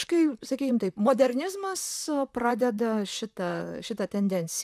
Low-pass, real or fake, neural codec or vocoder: 14.4 kHz; fake; vocoder, 44.1 kHz, 128 mel bands, Pupu-Vocoder